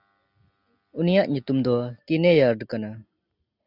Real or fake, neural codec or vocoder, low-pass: real; none; 5.4 kHz